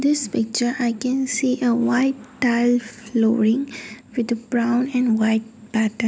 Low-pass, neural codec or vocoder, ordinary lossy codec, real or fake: none; none; none; real